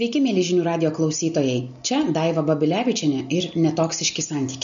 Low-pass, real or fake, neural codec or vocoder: 7.2 kHz; real; none